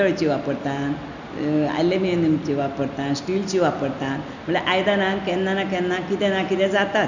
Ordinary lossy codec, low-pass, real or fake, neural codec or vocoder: none; 7.2 kHz; real; none